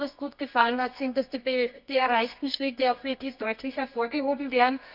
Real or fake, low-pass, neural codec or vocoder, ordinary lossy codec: fake; 5.4 kHz; codec, 24 kHz, 0.9 kbps, WavTokenizer, medium music audio release; none